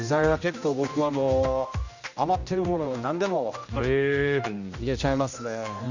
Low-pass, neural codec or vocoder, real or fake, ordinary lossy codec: 7.2 kHz; codec, 16 kHz, 1 kbps, X-Codec, HuBERT features, trained on general audio; fake; none